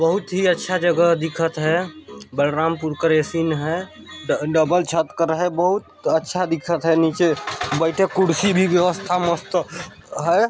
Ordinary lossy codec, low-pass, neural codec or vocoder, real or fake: none; none; none; real